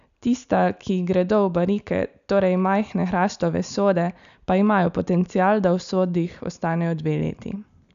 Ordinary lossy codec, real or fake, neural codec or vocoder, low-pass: none; real; none; 7.2 kHz